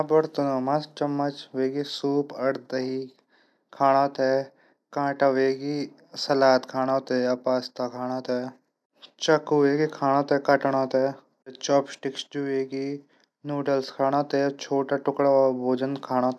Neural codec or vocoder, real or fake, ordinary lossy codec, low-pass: none; real; none; none